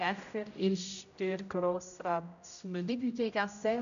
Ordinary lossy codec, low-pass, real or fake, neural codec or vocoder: none; 7.2 kHz; fake; codec, 16 kHz, 0.5 kbps, X-Codec, HuBERT features, trained on general audio